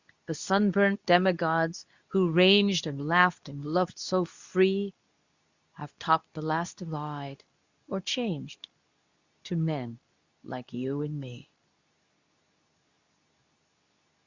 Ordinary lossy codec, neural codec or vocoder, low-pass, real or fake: Opus, 64 kbps; codec, 24 kHz, 0.9 kbps, WavTokenizer, medium speech release version 2; 7.2 kHz; fake